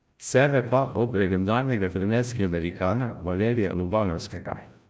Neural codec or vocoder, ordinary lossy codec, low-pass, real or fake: codec, 16 kHz, 0.5 kbps, FreqCodec, larger model; none; none; fake